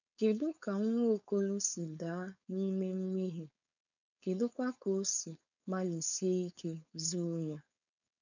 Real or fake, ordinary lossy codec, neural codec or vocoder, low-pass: fake; none; codec, 16 kHz, 4.8 kbps, FACodec; 7.2 kHz